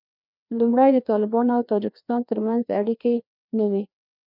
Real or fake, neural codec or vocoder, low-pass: fake; codec, 16 kHz, 2 kbps, FreqCodec, larger model; 5.4 kHz